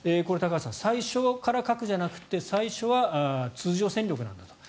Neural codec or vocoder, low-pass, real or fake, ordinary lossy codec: none; none; real; none